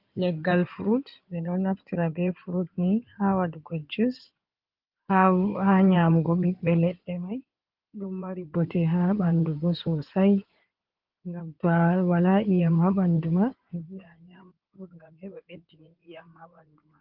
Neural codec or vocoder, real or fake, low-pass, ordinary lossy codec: codec, 16 kHz in and 24 kHz out, 2.2 kbps, FireRedTTS-2 codec; fake; 5.4 kHz; Opus, 32 kbps